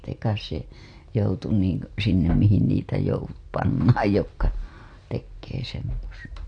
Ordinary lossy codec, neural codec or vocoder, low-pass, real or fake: AAC, 48 kbps; none; 9.9 kHz; real